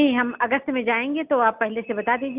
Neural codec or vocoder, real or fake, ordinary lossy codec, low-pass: none; real; Opus, 64 kbps; 3.6 kHz